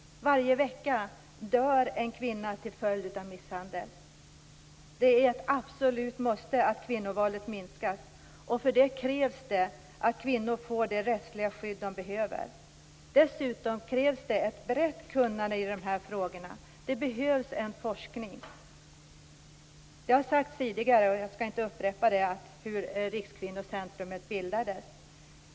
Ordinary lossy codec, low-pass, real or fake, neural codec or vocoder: none; none; real; none